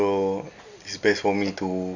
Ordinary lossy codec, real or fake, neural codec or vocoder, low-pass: none; real; none; 7.2 kHz